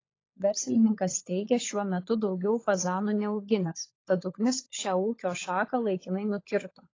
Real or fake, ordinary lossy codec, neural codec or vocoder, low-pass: fake; AAC, 32 kbps; codec, 16 kHz, 16 kbps, FunCodec, trained on LibriTTS, 50 frames a second; 7.2 kHz